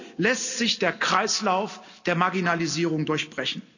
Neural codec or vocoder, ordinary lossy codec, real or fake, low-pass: none; none; real; 7.2 kHz